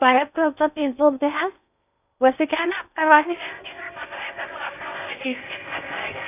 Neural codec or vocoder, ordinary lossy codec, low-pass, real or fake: codec, 16 kHz in and 24 kHz out, 0.6 kbps, FocalCodec, streaming, 4096 codes; none; 3.6 kHz; fake